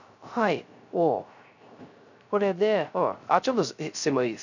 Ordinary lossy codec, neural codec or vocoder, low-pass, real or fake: none; codec, 16 kHz, 0.3 kbps, FocalCodec; 7.2 kHz; fake